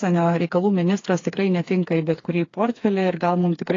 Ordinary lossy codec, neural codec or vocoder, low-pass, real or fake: AAC, 32 kbps; codec, 16 kHz, 4 kbps, FreqCodec, smaller model; 7.2 kHz; fake